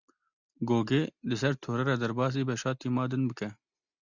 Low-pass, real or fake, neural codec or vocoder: 7.2 kHz; real; none